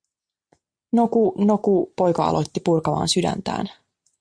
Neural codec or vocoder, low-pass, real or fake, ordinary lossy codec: none; 9.9 kHz; real; Opus, 64 kbps